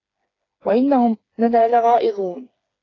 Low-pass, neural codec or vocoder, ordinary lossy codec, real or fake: 7.2 kHz; codec, 16 kHz, 4 kbps, FreqCodec, smaller model; AAC, 32 kbps; fake